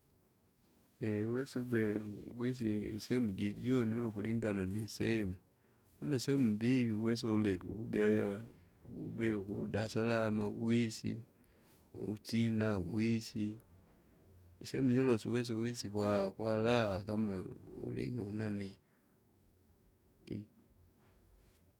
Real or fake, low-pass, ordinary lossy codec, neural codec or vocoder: fake; 19.8 kHz; none; codec, 44.1 kHz, 2.6 kbps, DAC